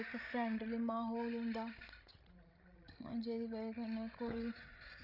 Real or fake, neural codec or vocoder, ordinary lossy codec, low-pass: fake; codec, 16 kHz, 16 kbps, FreqCodec, larger model; none; 5.4 kHz